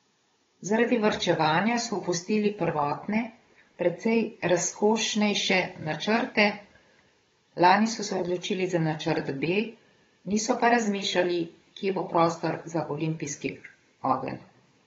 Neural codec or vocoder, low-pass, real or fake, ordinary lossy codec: codec, 16 kHz, 16 kbps, FunCodec, trained on Chinese and English, 50 frames a second; 7.2 kHz; fake; AAC, 24 kbps